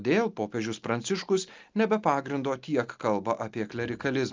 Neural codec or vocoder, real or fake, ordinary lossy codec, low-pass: none; real; Opus, 24 kbps; 7.2 kHz